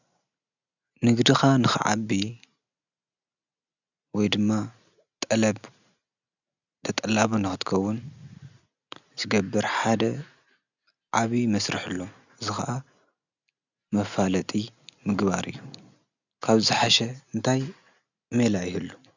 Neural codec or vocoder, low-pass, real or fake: none; 7.2 kHz; real